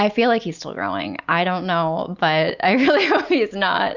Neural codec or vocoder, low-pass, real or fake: none; 7.2 kHz; real